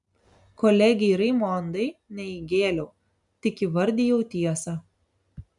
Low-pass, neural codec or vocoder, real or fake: 10.8 kHz; none; real